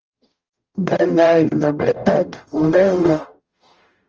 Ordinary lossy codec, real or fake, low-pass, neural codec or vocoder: Opus, 24 kbps; fake; 7.2 kHz; codec, 44.1 kHz, 0.9 kbps, DAC